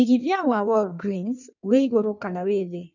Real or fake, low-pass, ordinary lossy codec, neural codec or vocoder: fake; 7.2 kHz; none; codec, 16 kHz in and 24 kHz out, 1.1 kbps, FireRedTTS-2 codec